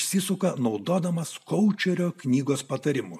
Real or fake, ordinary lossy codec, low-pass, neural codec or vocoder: real; MP3, 96 kbps; 14.4 kHz; none